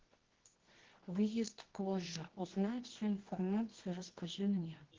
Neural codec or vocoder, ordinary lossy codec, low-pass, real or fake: codec, 24 kHz, 0.9 kbps, WavTokenizer, medium music audio release; Opus, 16 kbps; 7.2 kHz; fake